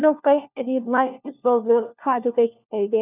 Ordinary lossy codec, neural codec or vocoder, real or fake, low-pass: none; codec, 16 kHz, 1 kbps, FunCodec, trained on LibriTTS, 50 frames a second; fake; 3.6 kHz